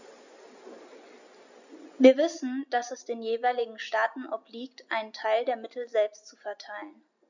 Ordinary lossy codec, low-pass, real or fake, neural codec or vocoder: none; 7.2 kHz; real; none